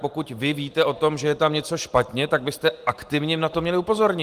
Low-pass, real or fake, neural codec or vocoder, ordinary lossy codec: 14.4 kHz; real; none; Opus, 24 kbps